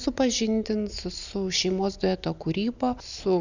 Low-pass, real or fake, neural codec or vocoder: 7.2 kHz; real; none